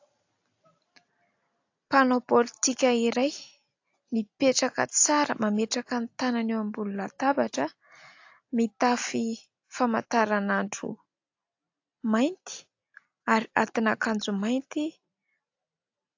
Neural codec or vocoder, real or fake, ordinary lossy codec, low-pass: none; real; AAC, 48 kbps; 7.2 kHz